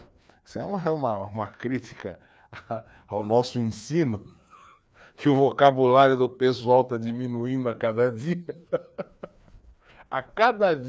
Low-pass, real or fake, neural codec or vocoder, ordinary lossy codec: none; fake; codec, 16 kHz, 2 kbps, FreqCodec, larger model; none